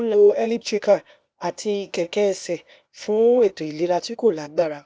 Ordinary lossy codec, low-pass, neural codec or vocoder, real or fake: none; none; codec, 16 kHz, 0.8 kbps, ZipCodec; fake